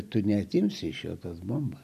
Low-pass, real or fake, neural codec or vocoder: 14.4 kHz; real; none